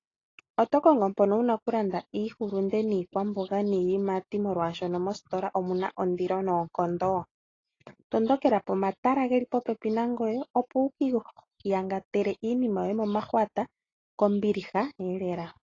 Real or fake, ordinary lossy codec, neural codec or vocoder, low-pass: real; AAC, 32 kbps; none; 7.2 kHz